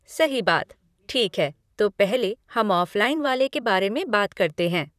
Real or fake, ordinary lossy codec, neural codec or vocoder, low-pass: fake; none; vocoder, 44.1 kHz, 128 mel bands, Pupu-Vocoder; 14.4 kHz